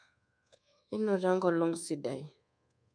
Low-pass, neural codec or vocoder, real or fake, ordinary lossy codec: 9.9 kHz; codec, 24 kHz, 1.2 kbps, DualCodec; fake; none